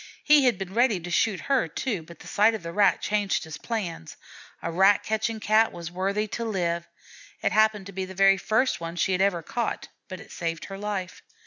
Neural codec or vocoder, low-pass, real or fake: none; 7.2 kHz; real